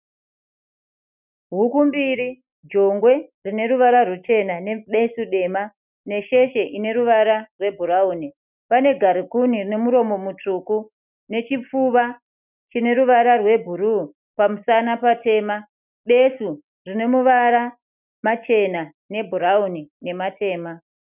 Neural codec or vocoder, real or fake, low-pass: none; real; 3.6 kHz